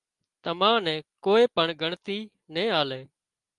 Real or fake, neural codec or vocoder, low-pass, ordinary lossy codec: real; none; 10.8 kHz; Opus, 32 kbps